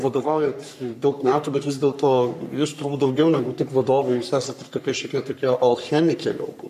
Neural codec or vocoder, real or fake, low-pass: codec, 44.1 kHz, 3.4 kbps, Pupu-Codec; fake; 14.4 kHz